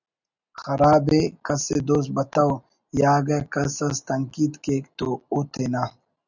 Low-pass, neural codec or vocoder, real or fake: 7.2 kHz; none; real